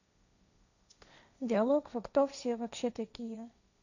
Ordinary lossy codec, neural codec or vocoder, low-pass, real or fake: none; codec, 16 kHz, 1.1 kbps, Voila-Tokenizer; 7.2 kHz; fake